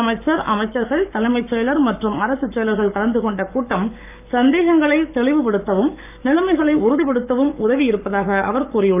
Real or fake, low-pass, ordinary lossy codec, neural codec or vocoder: fake; 3.6 kHz; none; codec, 44.1 kHz, 7.8 kbps, Pupu-Codec